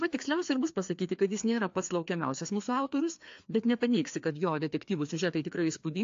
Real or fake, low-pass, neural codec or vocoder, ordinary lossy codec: fake; 7.2 kHz; codec, 16 kHz, 2 kbps, FreqCodec, larger model; AAC, 48 kbps